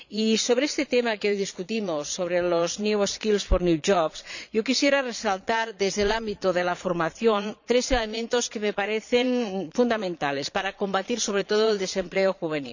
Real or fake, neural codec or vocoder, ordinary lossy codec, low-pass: fake; vocoder, 22.05 kHz, 80 mel bands, Vocos; none; 7.2 kHz